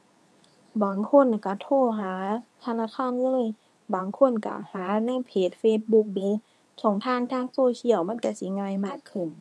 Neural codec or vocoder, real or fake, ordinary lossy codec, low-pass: codec, 24 kHz, 0.9 kbps, WavTokenizer, medium speech release version 2; fake; none; none